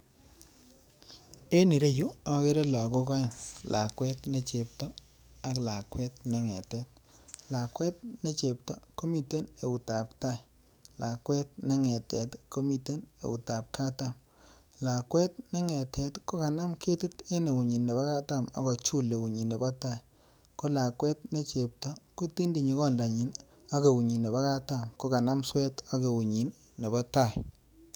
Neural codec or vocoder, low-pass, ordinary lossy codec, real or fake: codec, 44.1 kHz, 7.8 kbps, DAC; none; none; fake